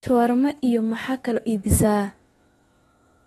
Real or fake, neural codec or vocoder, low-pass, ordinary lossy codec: fake; autoencoder, 48 kHz, 32 numbers a frame, DAC-VAE, trained on Japanese speech; 19.8 kHz; AAC, 32 kbps